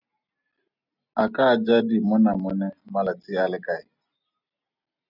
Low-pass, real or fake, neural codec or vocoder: 5.4 kHz; real; none